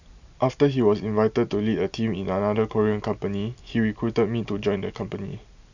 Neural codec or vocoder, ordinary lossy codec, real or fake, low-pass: none; none; real; 7.2 kHz